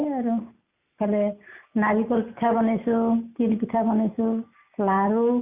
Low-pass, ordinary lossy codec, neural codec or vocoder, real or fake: 3.6 kHz; Opus, 64 kbps; none; real